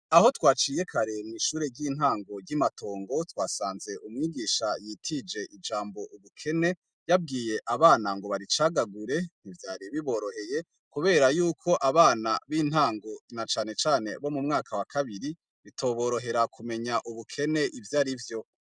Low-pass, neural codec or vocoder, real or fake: 9.9 kHz; none; real